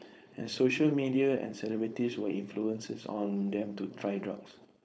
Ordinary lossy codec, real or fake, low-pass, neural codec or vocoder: none; fake; none; codec, 16 kHz, 4.8 kbps, FACodec